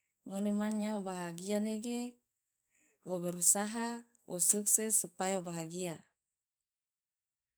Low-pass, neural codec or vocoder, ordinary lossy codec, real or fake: none; codec, 44.1 kHz, 2.6 kbps, SNAC; none; fake